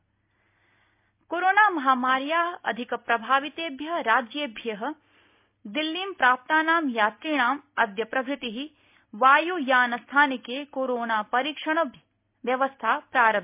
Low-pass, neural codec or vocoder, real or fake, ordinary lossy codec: 3.6 kHz; none; real; MP3, 32 kbps